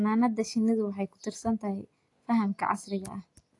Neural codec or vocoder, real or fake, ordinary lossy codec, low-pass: none; real; AAC, 48 kbps; 10.8 kHz